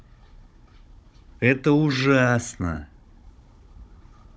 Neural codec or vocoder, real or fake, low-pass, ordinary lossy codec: codec, 16 kHz, 16 kbps, FunCodec, trained on Chinese and English, 50 frames a second; fake; none; none